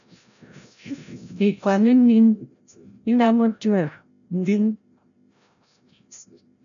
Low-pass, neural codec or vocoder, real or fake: 7.2 kHz; codec, 16 kHz, 0.5 kbps, FreqCodec, larger model; fake